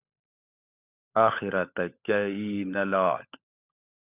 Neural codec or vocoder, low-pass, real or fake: codec, 16 kHz, 16 kbps, FunCodec, trained on LibriTTS, 50 frames a second; 3.6 kHz; fake